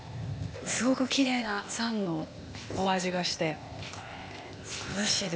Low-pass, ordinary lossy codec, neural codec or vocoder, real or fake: none; none; codec, 16 kHz, 0.8 kbps, ZipCodec; fake